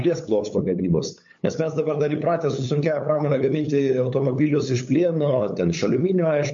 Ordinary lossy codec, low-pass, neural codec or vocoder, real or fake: MP3, 48 kbps; 7.2 kHz; codec, 16 kHz, 8 kbps, FunCodec, trained on LibriTTS, 25 frames a second; fake